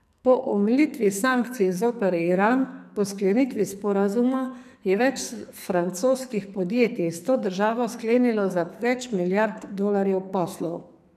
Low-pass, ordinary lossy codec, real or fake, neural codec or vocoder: 14.4 kHz; none; fake; codec, 44.1 kHz, 2.6 kbps, SNAC